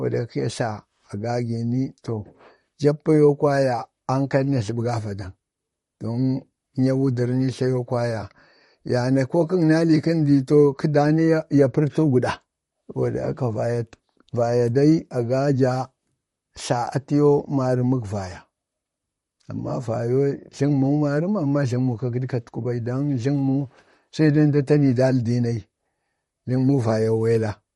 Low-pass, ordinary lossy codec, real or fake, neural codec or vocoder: 19.8 kHz; MP3, 48 kbps; fake; vocoder, 44.1 kHz, 128 mel bands, Pupu-Vocoder